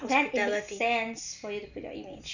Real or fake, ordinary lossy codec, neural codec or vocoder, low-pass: real; none; none; 7.2 kHz